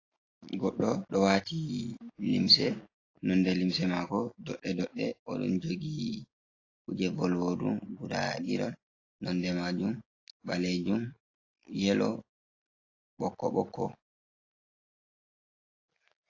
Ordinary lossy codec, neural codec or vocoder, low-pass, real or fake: AAC, 32 kbps; none; 7.2 kHz; real